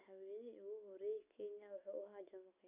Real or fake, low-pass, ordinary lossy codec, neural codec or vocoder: real; 3.6 kHz; none; none